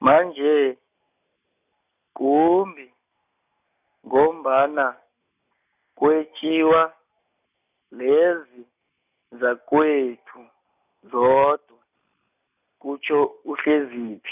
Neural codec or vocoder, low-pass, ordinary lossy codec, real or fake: none; 3.6 kHz; none; real